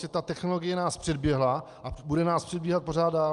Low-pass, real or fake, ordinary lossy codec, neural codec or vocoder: 10.8 kHz; real; Opus, 32 kbps; none